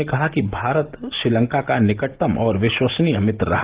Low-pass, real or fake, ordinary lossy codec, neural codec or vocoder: 3.6 kHz; real; Opus, 16 kbps; none